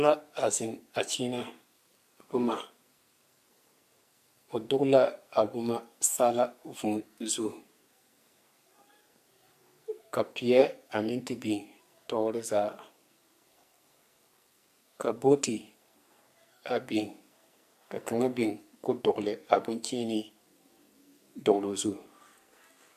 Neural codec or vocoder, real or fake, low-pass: codec, 44.1 kHz, 2.6 kbps, SNAC; fake; 14.4 kHz